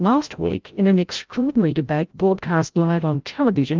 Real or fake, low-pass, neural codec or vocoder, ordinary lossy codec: fake; 7.2 kHz; codec, 16 kHz, 0.5 kbps, FreqCodec, larger model; Opus, 32 kbps